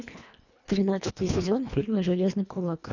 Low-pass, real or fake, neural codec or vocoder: 7.2 kHz; fake; codec, 24 kHz, 1.5 kbps, HILCodec